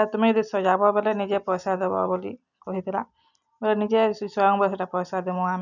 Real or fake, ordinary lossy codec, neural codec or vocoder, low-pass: real; none; none; 7.2 kHz